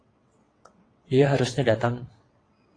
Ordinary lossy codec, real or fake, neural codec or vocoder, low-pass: AAC, 32 kbps; fake; vocoder, 22.05 kHz, 80 mel bands, WaveNeXt; 9.9 kHz